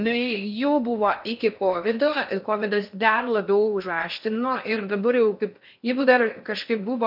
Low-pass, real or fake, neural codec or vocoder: 5.4 kHz; fake; codec, 16 kHz in and 24 kHz out, 0.6 kbps, FocalCodec, streaming, 4096 codes